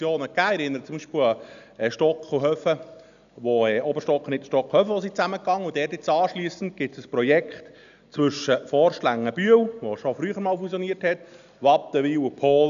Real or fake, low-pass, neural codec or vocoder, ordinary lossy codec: real; 7.2 kHz; none; none